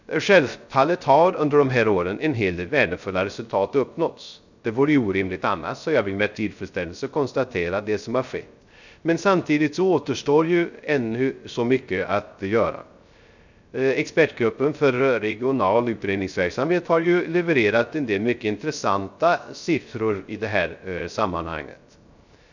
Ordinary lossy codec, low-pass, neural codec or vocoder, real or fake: none; 7.2 kHz; codec, 16 kHz, 0.3 kbps, FocalCodec; fake